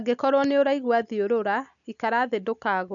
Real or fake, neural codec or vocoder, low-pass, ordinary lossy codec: real; none; 7.2 kHz; none